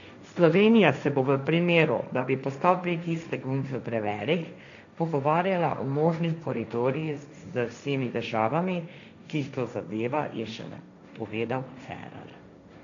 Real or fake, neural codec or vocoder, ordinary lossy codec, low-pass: fake; codec, 16 kHz, 1.1 kbps, Voila-Tokenizer; none; 7.2 kHz